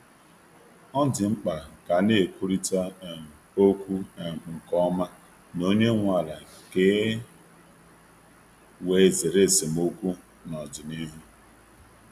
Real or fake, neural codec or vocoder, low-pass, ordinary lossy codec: real; none; 14.4 kHz; none